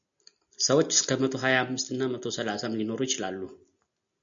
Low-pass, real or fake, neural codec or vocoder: 7.2 kHz; real; none